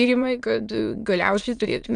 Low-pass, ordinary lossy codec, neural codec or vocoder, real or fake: 9.9 kHz; Opus, 64 kbps; autoencoder, 22.05 kHz, a latent of 192 numbers a frame, VITS, trained on many speakers; fake